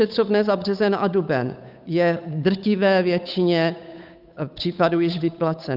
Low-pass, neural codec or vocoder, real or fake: 5.4 kHz; codec, 16 kHz, 8 kbps, FunCodec, trained on Chinese and English, 25 frames a second; fake